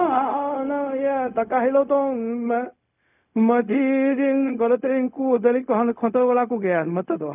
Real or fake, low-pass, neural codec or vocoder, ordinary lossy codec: fake; 3.6 kHz; codec, 16 kHz, 0.4 kbps, LongCat-Audio-Codec; none